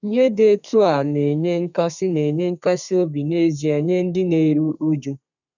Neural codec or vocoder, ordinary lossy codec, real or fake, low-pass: codec, 32 kHz, 1.9 kbps, SNAC; none; fake; 7.2 kHz